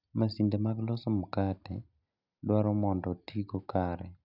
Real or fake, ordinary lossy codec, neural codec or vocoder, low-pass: real; none; none; 5.4 kHz